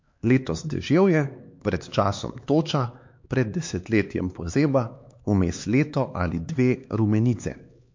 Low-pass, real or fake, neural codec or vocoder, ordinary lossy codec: 7.2 kHz; fake; codec, 16 kHz, 4 kbps, X-Codec, HuBERT features, trained on LibriSpeech; MP3, 48 kbps